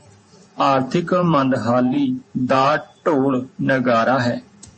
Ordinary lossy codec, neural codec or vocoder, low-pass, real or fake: MP3, 32 kbps; vocoder, 48 kHz, 128 mel bands, Vocos; 10.8 kHz; fake